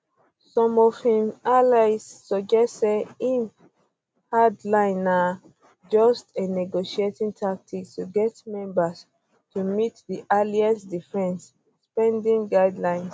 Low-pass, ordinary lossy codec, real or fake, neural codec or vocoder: none; none; real; none